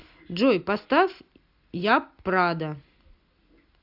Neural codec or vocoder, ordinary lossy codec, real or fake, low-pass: none; AAC, 48 kbps; real; 5.4 kHz